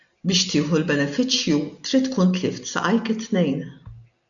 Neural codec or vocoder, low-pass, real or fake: none; 7.2 kHz; real